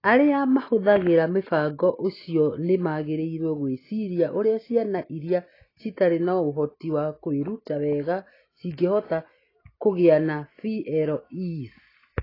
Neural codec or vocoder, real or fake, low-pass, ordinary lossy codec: none; real; 5.4 kHz; AAC, 24 kbps